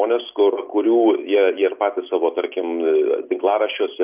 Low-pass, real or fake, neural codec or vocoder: 3.6 kHz; real; none